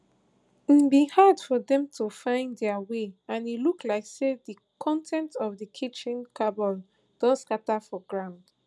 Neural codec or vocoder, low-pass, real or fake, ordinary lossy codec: none; none; real; none